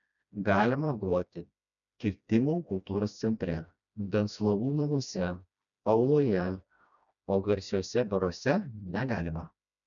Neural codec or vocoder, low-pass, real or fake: codec, 16 kHz, 1 kbps, FreqCodec, smaller model; 7.2 kHz; fake